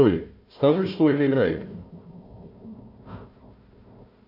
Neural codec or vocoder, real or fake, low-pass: codec, 16 kHz, 1 kbps, FunCodec, trained on Chinese and English, 50 frames a second; fake; 5.4 kHz